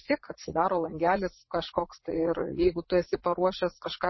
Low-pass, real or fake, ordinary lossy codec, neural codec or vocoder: 7.2 kHz; fake; MP3, 24 kbps; vocoder, 44.1 kHz, 80 mel bands, Vocos